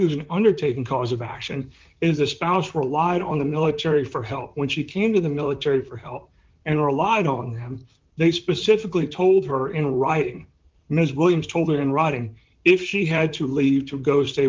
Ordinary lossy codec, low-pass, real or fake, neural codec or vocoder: Opus, 32 kbps; 7.2 kHz; fake; vocoder, 44.1 kHz, 128 mel bands, Pupu-Vocoder